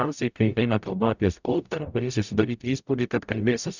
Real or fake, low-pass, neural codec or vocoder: fake; 7.2 kHz; codec, 44.1 kHz, 0.9 kbps, DAC